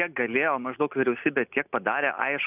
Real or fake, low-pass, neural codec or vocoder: real; 3.6 kHz; none